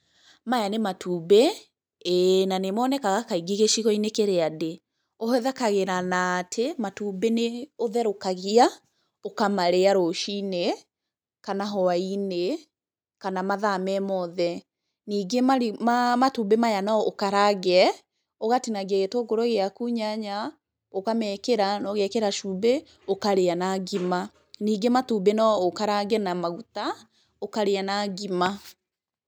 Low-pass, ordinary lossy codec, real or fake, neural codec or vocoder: none; none; real; none